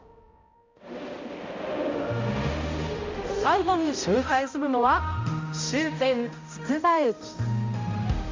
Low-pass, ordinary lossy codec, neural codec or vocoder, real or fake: 7.2 kHz; MP3, 48 kbps; codec, 16 kHz, 0.5 kbps, X-Codec, HuBERT features, trained on balanced general audio; fake